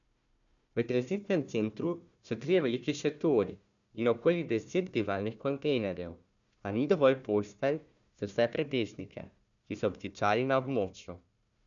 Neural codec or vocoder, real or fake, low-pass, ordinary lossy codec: codec, 16 kHz, 1 kbps, FunCodec, trained on Chinese and English, 50 frames a second; fake; 7.2 kHz; none